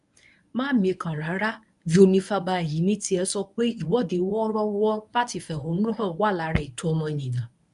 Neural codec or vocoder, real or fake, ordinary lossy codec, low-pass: codec, 24 kHz, 0.9 kbps, WavTokenizer, medium speech release version 1; fake; none; 10.8 kHz